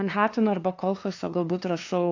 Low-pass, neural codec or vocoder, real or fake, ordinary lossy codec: 7.2 kHz; codec, 16 kHz, 2 kbps, FunCodec, trained on LibriTTS, 25 frames a second; fake; AAC, 48 kbps